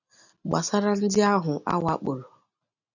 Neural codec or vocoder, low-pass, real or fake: none; 7.2 kHz; real